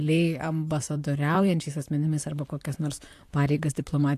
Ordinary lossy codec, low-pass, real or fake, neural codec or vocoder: AAC, 64 kbps; 14.4 kHz; fake; vocoder, 44.1 kHz, 128 mel bands, Pupu-Vocoder